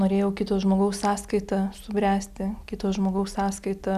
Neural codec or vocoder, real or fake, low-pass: none; real; 14.4 kHz